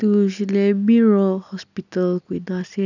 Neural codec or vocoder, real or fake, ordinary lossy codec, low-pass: none; real; none; 7.2 kHz